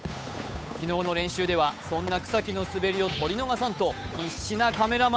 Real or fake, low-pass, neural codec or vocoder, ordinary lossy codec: fake; none; codec, 16 kHz, 8 kbps, FunCodec, trained on Chinese and English, 25 frames a second; none